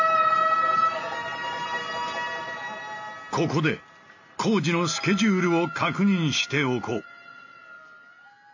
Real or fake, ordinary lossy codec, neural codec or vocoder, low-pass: real; AAC, 48 kbps; none; 7.2 kHz